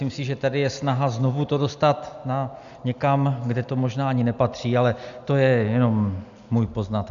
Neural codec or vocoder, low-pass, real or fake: none; 7.2 kHz; real